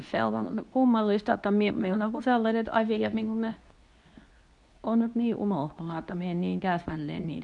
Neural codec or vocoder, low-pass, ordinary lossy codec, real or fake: codec, 24 kHz, 0.9 kbps, WavTokenizer, medium speech release version 2; 10.8 kHz; none; fake